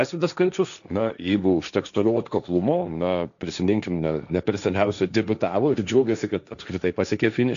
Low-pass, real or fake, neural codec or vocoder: 7.2 kHz; fake; codec, 16 kHz, 1.1 kbps, Voila-Tokenizer